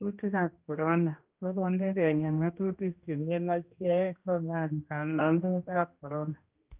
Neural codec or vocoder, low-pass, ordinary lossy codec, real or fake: codec, 16 kHz, 1 kbps, X-Codec, HuBERT features, trained on general audio; 3.6 kHz; Opus, 24 kbps; fake